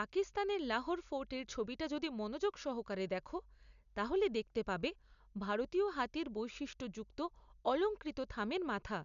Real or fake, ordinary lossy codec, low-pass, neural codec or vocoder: real; MP3, 96 kbps; 7.2 kHz; none